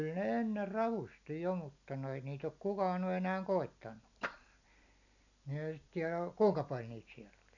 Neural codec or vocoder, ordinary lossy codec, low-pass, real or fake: none; none; 7.2 kHz; real